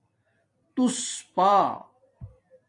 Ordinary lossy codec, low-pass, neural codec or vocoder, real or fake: AAC, 48 kbps; 10.8 kHz; vocoder, 44.1 kHz, 128 mel bands every 256 samples, BigVGAN v2; fake